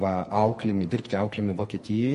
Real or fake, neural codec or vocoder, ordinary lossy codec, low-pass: fake; codec, 44.1 kHz, 2.6 kbps, SNAC; MP3, 48 kbps; 14.4 kHz